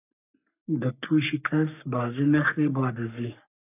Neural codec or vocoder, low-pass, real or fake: codec, 44.1 kHz, 3.4 kbps, Pupu-Codec; 3.6 kHz; fake